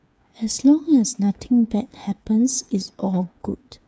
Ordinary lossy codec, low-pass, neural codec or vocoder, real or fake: none; none; codec, 16 kHz, 8 kbps, FreqCodec, smaller model; fake